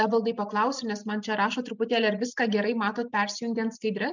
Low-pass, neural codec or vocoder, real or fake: 7.2 kHz; none; real